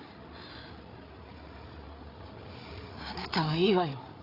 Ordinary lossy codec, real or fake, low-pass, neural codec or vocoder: AAC, 24 kbps; fake; 5.4 kHz; codec, 16 kHz, 8 kbps, FreqCodec, larger model